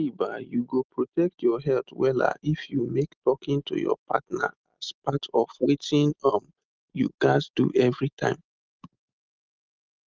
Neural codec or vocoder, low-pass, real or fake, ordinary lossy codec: none; 7.2 kHz; real; Opus, 32 kbps